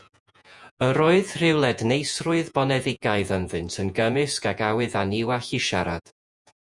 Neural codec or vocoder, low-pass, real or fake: vocoder, 48 kHz, 128 mel bands, Vocos; 10.8 kHz; fake